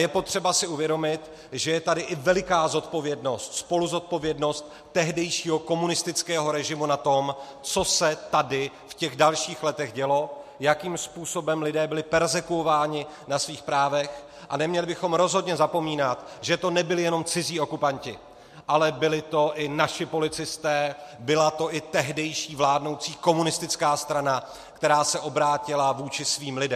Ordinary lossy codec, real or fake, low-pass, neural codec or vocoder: MP3, 64 kbps; real; 14.4 kHz; none